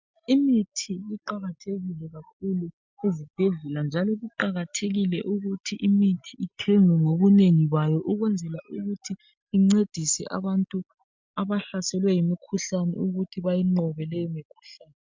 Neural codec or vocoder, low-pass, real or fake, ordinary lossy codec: none; 7.2 kHz; real; MP3, 64 kbps